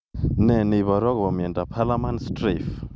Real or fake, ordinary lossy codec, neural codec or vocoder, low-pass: real; none; none; none